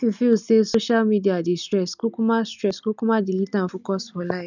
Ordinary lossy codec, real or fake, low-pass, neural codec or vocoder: none; real; 7.2 kHz; none